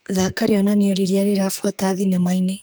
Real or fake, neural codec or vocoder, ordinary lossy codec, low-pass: fake; codec, 44.1 kHz, 2.6 kbps, SNAC; none; none